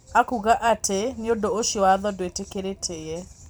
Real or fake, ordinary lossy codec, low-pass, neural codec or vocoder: fake; none; none; vocoder, 44.1 kHz, 128 mel bands every 512 samples, BigVGAN v2